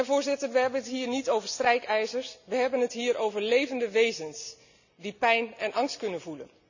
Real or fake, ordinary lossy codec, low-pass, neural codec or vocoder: real; none; 7.2 kHz; none